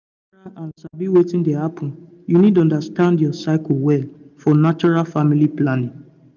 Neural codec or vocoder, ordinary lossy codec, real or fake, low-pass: none; none; real; 7.2 kHz